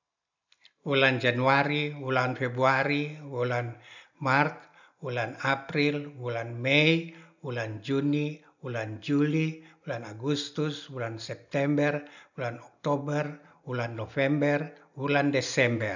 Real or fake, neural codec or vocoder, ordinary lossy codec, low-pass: real; none; none; 7.2 kHz